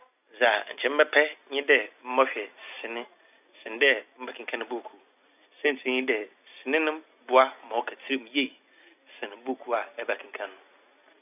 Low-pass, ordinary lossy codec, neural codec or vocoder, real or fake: 3.6 kHz; none; none; real